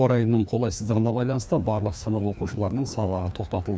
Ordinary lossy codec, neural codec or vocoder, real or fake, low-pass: none; codec, 16 kHz, 2 kbps, FreqCodec, larger model; fake; none